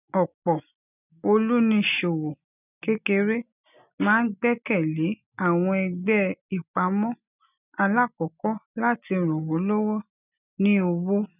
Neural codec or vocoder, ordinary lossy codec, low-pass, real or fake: none; none; 3.6 kHz; real